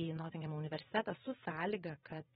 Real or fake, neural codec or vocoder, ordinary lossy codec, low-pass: fake; vocoder, 22.05 kHz, 80 mel bands, WaveNeXt; AAC, 16 kbps; 9.9 kHz